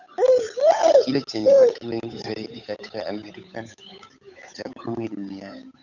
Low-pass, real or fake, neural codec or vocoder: 7.2 kHz; fake; codec, 16 kHz, 8 kbps, FunCodec, trained on Chinese and English, 25 frames a second